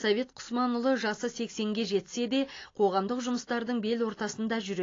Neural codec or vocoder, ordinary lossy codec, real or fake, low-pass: none; AAC, 32 kbps; real; 7.2 kHz